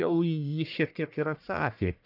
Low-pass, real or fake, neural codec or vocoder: 5.4 kHz; fake; codec, 44.1 kHz, 1.7 kbps, Pupu-Codec